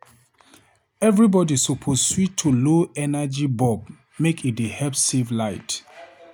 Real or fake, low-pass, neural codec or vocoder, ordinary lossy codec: real; none; none; none